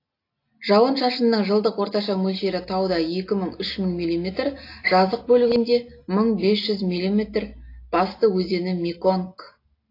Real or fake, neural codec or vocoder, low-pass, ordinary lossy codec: real; none; 5.4 kHz; AAC, 32 kbps